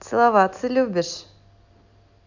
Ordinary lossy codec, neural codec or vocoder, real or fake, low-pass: none; none; real; 7.2 kHz